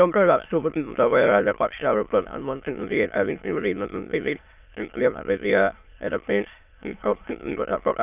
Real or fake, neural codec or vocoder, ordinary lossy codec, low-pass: fake; autoencoder, 22.05 kHz, a latent of 192 numbers a frame, VITS, trained on many speakers; none; 3.6 kHz